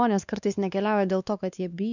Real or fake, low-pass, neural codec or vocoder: fake; 7.2 kHz; codec, 16 kHz, 2 kbps, X-Codec, WavLM features, trained on Multilingual LibriSpeech